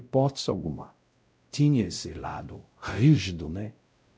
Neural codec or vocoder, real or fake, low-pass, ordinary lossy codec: codec, 16 kHz, 0.5 kbps, X-Codec, WavLM features, trained on Multilingual LibriSpeech; fake; none; none